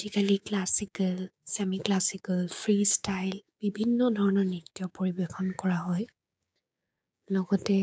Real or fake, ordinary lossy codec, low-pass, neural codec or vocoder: fake; none; none; codec, 16 kHz, 6 kbps, DAC